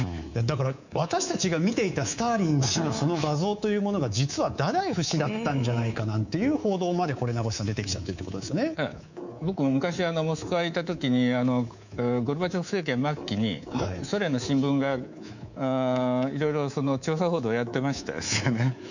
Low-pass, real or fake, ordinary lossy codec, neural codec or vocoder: 7.2 kHz; fake; none; codec, 24 kHz, 3.1 kbps, DualCodec